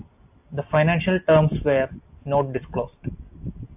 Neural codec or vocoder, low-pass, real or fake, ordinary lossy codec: none; 3.6 kHz; real; AAC, 32 kbps